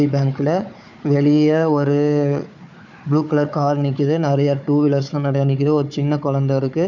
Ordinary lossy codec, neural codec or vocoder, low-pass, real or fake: none; codec, 16 kHz, 4 kbps, FunCodec, trained on Chinese and English, 50 frames a second; 7.2 kHz; fake